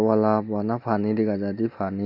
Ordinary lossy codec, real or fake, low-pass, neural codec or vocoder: none; real; 5.4 kHz; none